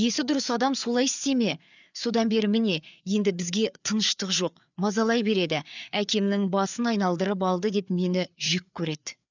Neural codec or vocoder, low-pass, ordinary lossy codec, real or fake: codec, 16 kHz, 4 kbps, FreqCodec, larger model; 7.2 kHz; none; fake